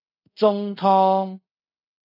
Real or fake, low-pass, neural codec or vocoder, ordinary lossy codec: fake; 5.4 kHz; codec, 24 kHz, 0.5 kbps, DualCodec; AAC, 24 kbps